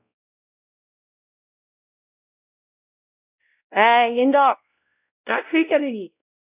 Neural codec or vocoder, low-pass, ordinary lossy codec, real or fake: codec, 16 kHz, 0.5 kbps, X-Codec, WavLM features, trained on Multilingual LibriSpeech; 3.6 kHz; none; fake